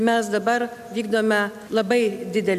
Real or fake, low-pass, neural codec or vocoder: real; 14.4 kHz; none